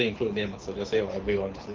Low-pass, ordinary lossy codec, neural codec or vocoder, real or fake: 7.2 kHz; Opus, 24 kbps; codec, 24 kHz, 6 kbps, HILCodec; fake